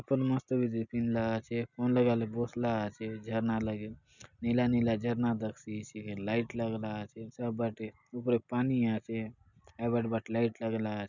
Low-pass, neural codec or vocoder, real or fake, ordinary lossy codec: 7.2 kHz; none; real; none